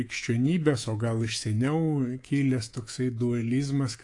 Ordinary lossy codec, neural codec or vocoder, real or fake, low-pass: AAC, 48 kbps; codec, 24 kHz, 3.1 kbps, DualCodec; fake; 10.8 kHz